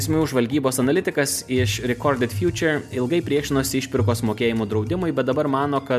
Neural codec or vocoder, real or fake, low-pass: none; real; 14.4 kHz